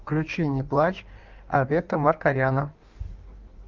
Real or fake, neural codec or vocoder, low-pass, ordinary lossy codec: fake; codec, 16 kHz in and 24 kHz out, 1.1 kbps, FireRedTTS-2 codec; 7.2 kHz; Opus, 32 kbps